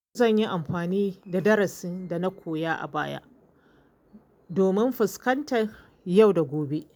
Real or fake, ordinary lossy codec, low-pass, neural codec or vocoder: real; none; 19.8 kHz; none